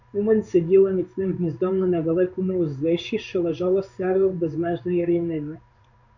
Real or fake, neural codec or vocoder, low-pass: fake; codec, 16 kHz in and 24 kHz out, 1 kbps, XY-Tokenizer; 7.2 kHz